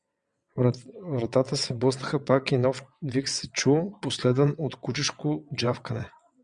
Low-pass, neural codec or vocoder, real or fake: 9.9 kHz; vocoder, 22.05 kHz, 80 mel bands, WaveNeXt; fake